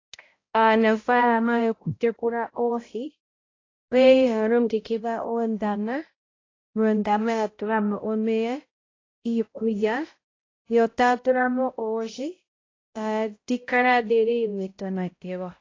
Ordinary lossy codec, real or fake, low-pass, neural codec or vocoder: AAC, 32 kbps; fake; 7.2 kHz; codec, 16 kHz, 0.5 kbps, X-Codec, HuBERT features, trained on balanced general audio